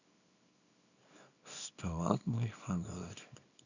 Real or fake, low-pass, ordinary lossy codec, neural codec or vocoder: fake; 7.2 kHz; none; codec, 24 kHz, 0.9 kbps, WavTokenizer, small release